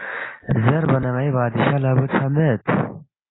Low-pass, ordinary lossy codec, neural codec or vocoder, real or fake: 7.2 kHz; AAC, 16 kbps; none; real